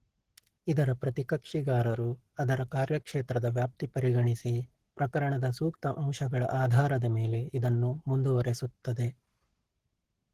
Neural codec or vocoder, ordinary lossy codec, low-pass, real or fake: codec, 44.1 kHz, 7.8 kbps, Pupu-Codec; Opus, 16 kbps; 14.4 kHz; fake